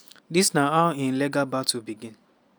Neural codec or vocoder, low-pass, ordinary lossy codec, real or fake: none; none; none; real